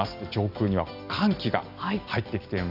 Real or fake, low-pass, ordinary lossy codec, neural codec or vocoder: real; 5.4 kHz; none; none